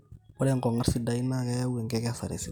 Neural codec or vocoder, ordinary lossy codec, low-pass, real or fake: none; none; 19.8 kHz; real